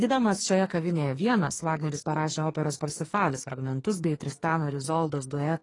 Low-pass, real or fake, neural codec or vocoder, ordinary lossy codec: 10.8 kHz; fake; codec, 44.1 kHz, 2.6 kbps, SNAC; AAC, 32 kbps